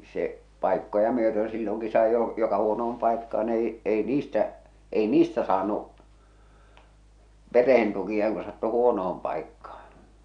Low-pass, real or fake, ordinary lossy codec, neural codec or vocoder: 9.9 kHz; real; none; none